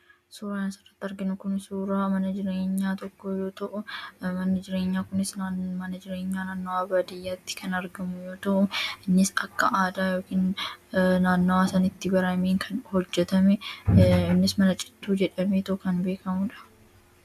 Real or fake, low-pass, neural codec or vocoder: real; 14.4 kHz; none